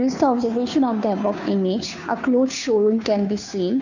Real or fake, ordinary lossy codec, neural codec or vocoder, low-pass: fake; none; codec, 16 kHz, 2 kbps, FunCodec, trained on Chinese and English, 25 frames a second; 7.2 kHz